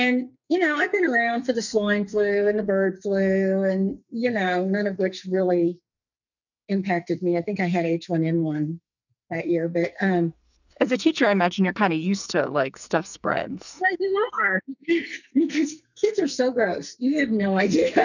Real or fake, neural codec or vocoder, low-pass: fake; codec, 44.1 kHz, 2.6 kbps, SNAC; 7.2 kHz